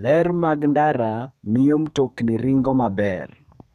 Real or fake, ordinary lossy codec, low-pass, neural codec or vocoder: fake; none; 14.4 kHz; codec, 32 kHz, 1.9 kbps, SNAC